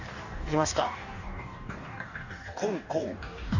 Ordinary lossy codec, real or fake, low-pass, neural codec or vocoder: none; fake; 7.2 kHz; codec, 44.1 kHz, 2.6 kbps, DAC